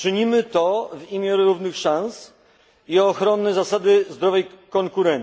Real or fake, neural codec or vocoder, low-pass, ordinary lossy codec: real; none; none; none